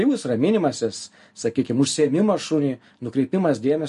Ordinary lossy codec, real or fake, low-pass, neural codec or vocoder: MP3, 48 kbps; real; 14.4 kHz; none